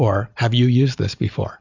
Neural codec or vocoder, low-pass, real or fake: none; 7.2 kHz; real